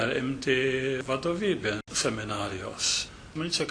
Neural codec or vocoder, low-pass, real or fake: none; 9.9 kHz; real